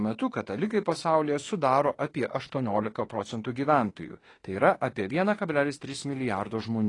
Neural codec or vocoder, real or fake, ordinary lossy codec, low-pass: autoencoder, 48 kHz, 32 numbers a frame, DAC-VAE, trained on Japanese speech; fake; AAC, 32 kbps; 10.8 kHz